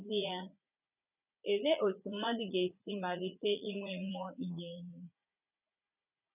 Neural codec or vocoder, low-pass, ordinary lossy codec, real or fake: vocoder, 44.1 kHz, 80 mel bands, Vocos; 3.6 kHz; none; fake